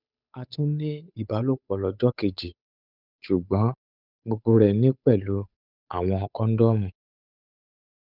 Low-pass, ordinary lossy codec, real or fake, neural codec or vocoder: 5.4 kHz; none; fake; codec, 16 kHz, 8 kbps, FunCodec, trained on Chinese and English, 25 frames a second